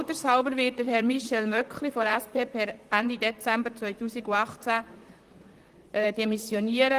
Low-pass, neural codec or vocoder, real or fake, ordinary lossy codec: 14.4 kHz; vocoder, 44.1 kHz, 128 mel bands, Pupu-Vocoder; fake; Opus, 24 kbps